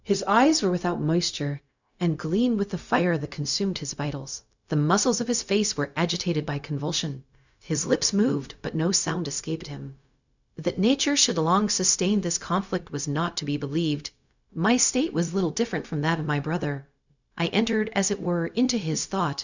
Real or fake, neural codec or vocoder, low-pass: fake; codec, 16 kHz, 0.4 kbps, LongCat-Audio-Codec; 7.2 kHz